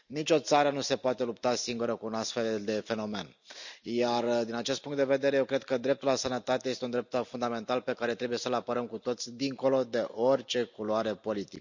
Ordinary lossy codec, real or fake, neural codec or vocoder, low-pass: none; real; none; 7.2 kHz